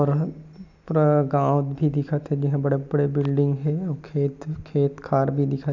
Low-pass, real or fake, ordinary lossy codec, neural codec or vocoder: 7.2 kHz; real; none; none